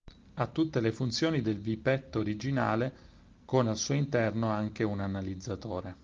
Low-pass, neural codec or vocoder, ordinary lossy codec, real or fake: 7.2 kHz; none; Opus, 32 kbps; real